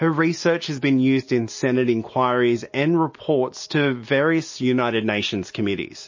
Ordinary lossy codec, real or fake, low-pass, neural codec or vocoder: MP3, 32 kbps; real; 7.2 kHz; none